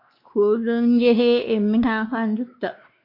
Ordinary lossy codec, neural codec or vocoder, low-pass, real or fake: MP3, 32 kbps; codec, 16 kHz, 2 kbps, X-Codec, WavLM features, trained on Multilingual LibriSpeech; 5.4 kHz; fake